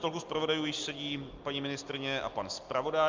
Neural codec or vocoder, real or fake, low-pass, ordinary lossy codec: none; real; 7.2 kHz; Opus, 32 kbps